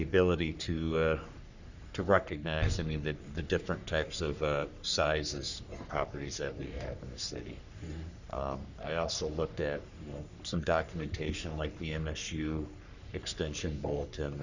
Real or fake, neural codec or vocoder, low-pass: fake; codec, 44.1 kHz, 3.4 kbps, Pupu-Codec; 7.2 kHz